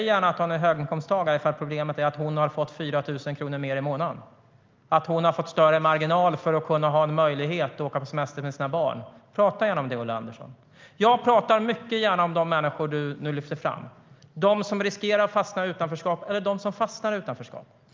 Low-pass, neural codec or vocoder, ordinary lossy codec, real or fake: 7.2 kHz; none; Opus, 24 kbps; real